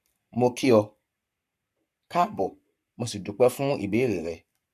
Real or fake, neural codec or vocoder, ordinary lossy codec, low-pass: fake; codec, 44.1 kHz, 7.8 kbps, Pupu-Codec; none; 14.4 kHz